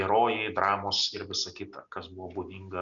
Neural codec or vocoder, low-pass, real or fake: none; 7.2 kHz; real